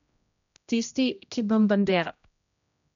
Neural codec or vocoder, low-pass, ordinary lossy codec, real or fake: codec, 16 kHz, 0.5 kbps, X-Codec, HuBERT features, trained on general audio; 7.2 kHz; none; fake